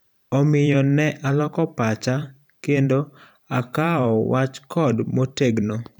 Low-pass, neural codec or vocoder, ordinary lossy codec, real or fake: none; vocoder, 44.1 kHz, 128 mel bands every 512 samples, BigVGAN v2; none; fake